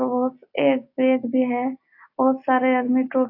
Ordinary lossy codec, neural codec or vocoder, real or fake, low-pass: AAC, 32 kbps; none; real; 5.4 kHz